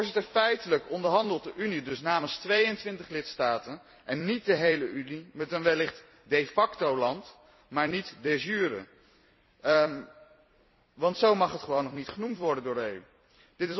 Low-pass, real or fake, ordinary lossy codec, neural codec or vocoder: 7.2 kHz; fake; MP3, 24 kbps; vocoder, 44.1 kHz, 128 mel bands every 256 samples, BigVGAN v2